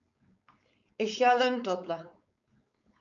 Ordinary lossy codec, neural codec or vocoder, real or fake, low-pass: MP3, 64 kbps; codec, 16 kHz, 4.8 kbps, FACodec; fake; 7.2 kHz